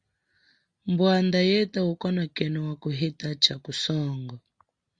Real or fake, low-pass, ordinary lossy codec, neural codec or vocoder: real; 9.9 kHz; Opus, 64 kbps; none